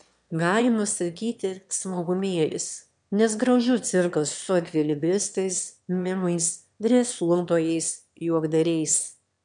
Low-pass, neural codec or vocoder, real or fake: 9.9 kHz; autoencoder, 22.05 kHz, a latent of 192 numbers a frame, VITS, trained on one speaker; fake